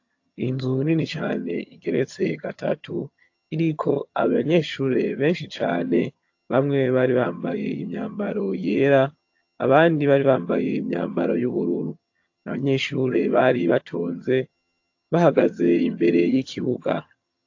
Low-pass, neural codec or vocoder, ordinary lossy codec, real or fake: 7.2 kHz; vocoder, 22.05 kHz, 80 mel bands, HiFi-GAN; AAC, 48 kbps; fake